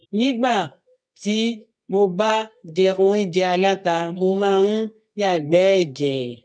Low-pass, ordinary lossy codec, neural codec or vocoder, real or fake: 9.9 kHz; none; codec, 24 kHz, 0.9 kbps, WavTokenizer, medium music audio release; fake